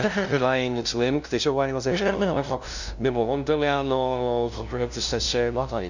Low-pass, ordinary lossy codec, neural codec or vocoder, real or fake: 7.2 kHz; none; codec, 16 kHz, 0.5 kbps, FunCodec, trained on LibriTTS, 25 frames a second; fake